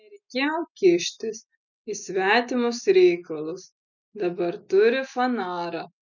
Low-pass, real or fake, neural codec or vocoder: 7.2 kHz; real; none